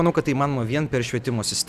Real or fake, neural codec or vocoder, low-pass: real; none; 14.4 kHz